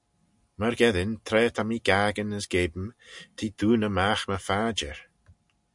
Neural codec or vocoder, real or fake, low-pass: none; real; 10.8 kHz